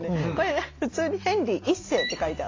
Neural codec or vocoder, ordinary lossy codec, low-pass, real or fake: none; AAC, 32 kbps; 7.2 kHz; real